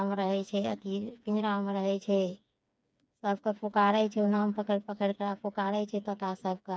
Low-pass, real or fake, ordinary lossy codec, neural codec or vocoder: none; fake; none; codec, 16 kHz, 4 kbps, FreqCodec, smaller model